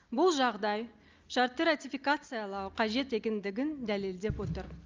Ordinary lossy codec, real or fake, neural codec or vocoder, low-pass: Opus, 24 kbps; real; none; 7.2 kHz